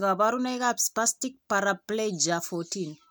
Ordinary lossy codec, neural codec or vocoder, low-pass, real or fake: none; none; none; real